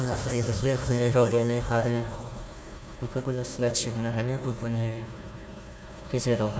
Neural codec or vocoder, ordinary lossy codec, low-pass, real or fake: codec, 16 kHz, 1 kbps, FunCodec, trained on Chinese and English, 50 frames a second; none; none; fake